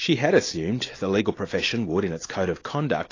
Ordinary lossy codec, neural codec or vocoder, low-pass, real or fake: AAC, 32 kbps; none; 7.2 kHz; real